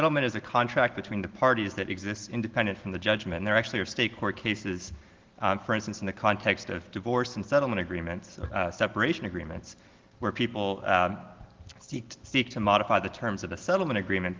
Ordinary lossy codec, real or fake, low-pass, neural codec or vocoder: Opus, 16 kbps; real; 7.2 kHz; none